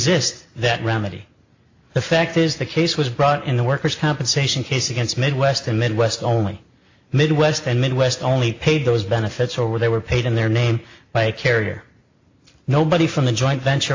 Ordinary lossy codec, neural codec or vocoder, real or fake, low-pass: AAC, 48 kbps; none; real; 7.2 kHz